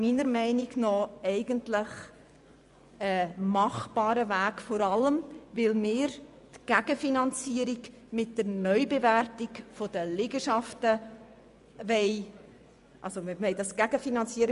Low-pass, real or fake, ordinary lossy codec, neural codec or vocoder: 10.8 kHz; fake; none; vocoder, 24 kHz, 100 mel bands, Vocos